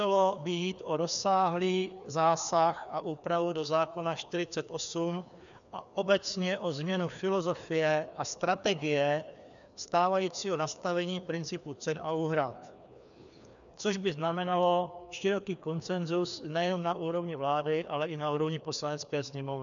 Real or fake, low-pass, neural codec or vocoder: fake; 7.2 kHz; codec, 16 kHz, 2 kbps, FreqCodec, larger model